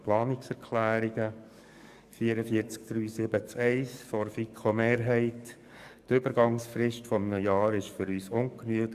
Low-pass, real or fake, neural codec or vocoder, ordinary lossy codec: 14.4 kHz; fake; codec, 44.1 kHz, 7.8 kbps, DAC; Opus, 64 kbps